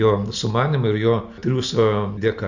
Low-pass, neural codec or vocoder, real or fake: 7.2 kHz; none; real